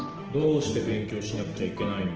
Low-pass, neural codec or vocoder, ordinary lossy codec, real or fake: 7.2 kHz; none; Opus, 16 kbps; real